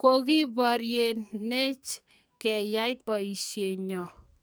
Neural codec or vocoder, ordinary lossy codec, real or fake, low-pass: codec, 44.1 kHz, 2.6 kbps, SNAC; none; fake; none